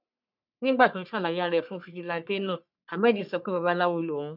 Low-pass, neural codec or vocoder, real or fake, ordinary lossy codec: 5.4 kHz; codec, 44.1 kHz, 3.4 kbps, Pupu-Codec; fake; none